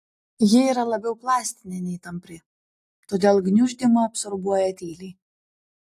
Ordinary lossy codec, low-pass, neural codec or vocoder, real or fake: AAC, 64 kbps; 14.4 kHz; none; real